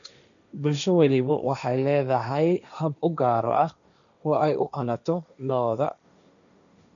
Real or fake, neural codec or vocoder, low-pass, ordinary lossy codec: fake; codec, 16 kHz, 1.1 kbps, Voila-Tokenizer; 7.2 kHz; none